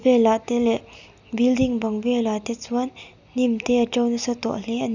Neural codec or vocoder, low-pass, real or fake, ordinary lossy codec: none; 7.2 kHz; real; none